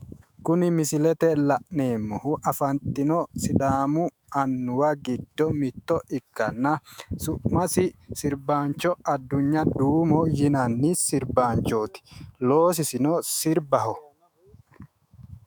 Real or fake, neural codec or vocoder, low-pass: fake; autoencoder, 48 kHz, 128 numbers a frame, DAC-VAE, trained on Japanese speech; 19.8 kHz